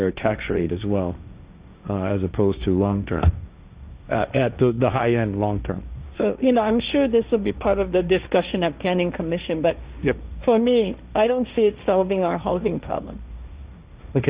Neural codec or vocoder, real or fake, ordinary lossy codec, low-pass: codec, 16 kHz, 1.1 kbps, Voila-Tokenizer; fake; Opus, 64 kbps; 3.6 kHz